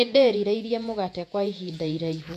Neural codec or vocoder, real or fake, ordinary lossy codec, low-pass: vocoder, 48 kHz, 128 mel bands, Vocos; fake; none; 14.4 kHz